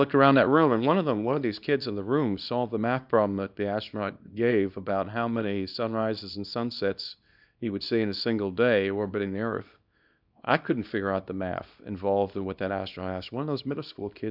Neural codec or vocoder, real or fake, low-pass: codec, 24 kHz, 0.9 kbps, WavTokenizer, small release; fake; 5.4 kHz